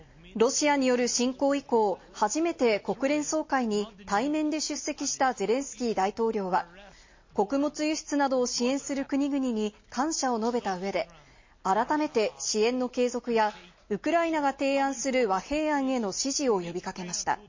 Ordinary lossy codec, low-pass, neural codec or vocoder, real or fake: MP3, 32 kbps; 7.2 kHz; none; real